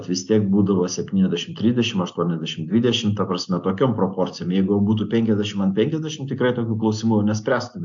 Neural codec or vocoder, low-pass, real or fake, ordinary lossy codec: none; 7.2 kHz; real; AAC, 64 kbps